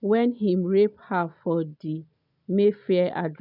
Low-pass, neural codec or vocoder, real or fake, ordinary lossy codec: 5.4 kHz; none; real; none